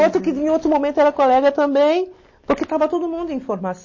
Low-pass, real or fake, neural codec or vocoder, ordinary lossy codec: 7.2 kHz; real; none; MP3, 32 kbps